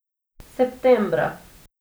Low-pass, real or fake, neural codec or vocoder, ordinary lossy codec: none; real; none; none